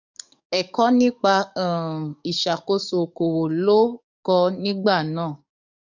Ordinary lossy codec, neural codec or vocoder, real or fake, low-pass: none; codec, 44.1 kHz, 7.8 kbps, DAC; fake; 7.2 kHz